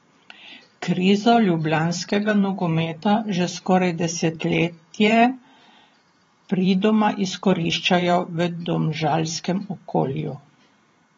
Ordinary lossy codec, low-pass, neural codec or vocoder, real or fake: AAC, 24 kbps; 19.8 kHz; vocoder, 44.1 kHz, 128 mel bands every 512 samples, BigVGAN v2; fake